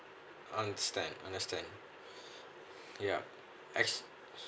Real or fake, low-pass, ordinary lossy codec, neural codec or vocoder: real; none; none; none